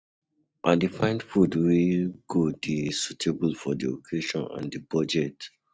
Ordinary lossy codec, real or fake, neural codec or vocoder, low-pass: none; real; none; none